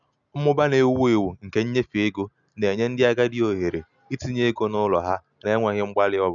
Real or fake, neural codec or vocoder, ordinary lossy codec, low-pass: real; none; none; 7.2 kHz